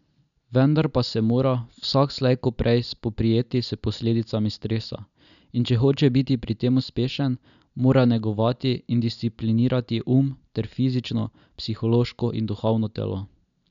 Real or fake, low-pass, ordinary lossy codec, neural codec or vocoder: real; 7.2 kHz; none; none